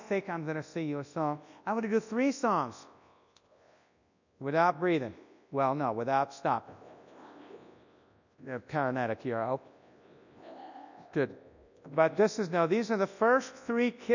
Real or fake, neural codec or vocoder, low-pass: fake; codec, 24 kHz, 0.9 kbps, WavTokenizer, large speech release; 7.2 kHz